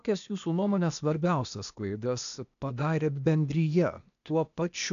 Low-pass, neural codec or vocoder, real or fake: 7.2 kHz; codec, 16 kHz, 0.8 kbps, ZipCodec; fake